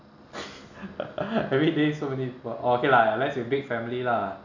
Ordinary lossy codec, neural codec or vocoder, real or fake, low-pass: none; none; real; 7.2 kHz